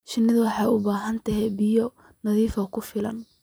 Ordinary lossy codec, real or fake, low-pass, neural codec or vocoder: none; real; none; none